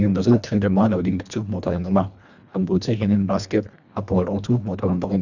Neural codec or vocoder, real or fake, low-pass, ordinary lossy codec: codec, 24 kHz, 1.5 kbps, HILCodec; fake; 7.2 kHz; none